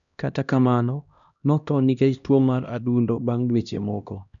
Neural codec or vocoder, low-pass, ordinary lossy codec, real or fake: codec, 16 kHz, 1 kbps, X-Codec, HuBERT features, trained on LibriSpeech; 7.2 kHz; none; fake